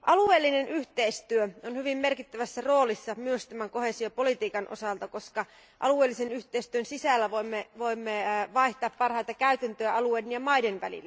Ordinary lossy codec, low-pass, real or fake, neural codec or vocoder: none; none; real; none